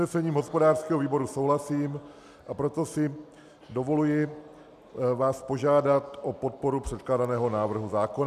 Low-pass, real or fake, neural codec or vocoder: 14.4 kHz; real; none